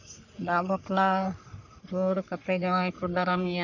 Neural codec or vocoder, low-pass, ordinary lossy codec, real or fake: codec, 44.1 kHz, 3.4 kbps, Pupu-Codec; 7.2 kHz; none; fake